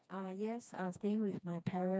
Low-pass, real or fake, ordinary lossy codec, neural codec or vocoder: none; fake; none; codec, 16 kHz, 2 kbps, FreqCodec, smaller model